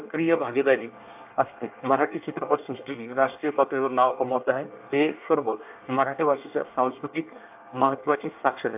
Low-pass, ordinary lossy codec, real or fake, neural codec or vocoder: 3.6 kHz; AAC, 32 kbps; fake; codec, 24 kHz, 1 kbps, SNAC